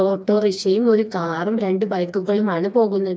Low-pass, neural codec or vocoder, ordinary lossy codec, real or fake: none; codec, 16 kHz, 2 kbps, FreqCodec, smaller model; none; fake